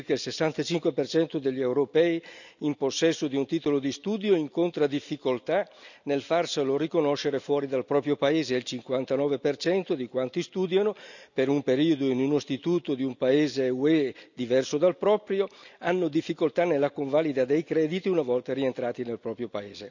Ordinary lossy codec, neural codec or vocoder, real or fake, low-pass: none; none; real; 7.2 kHz